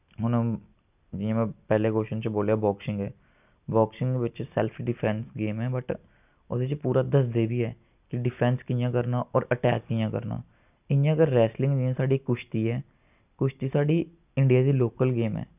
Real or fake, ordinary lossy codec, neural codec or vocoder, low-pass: real; none; none; 3.6 kHz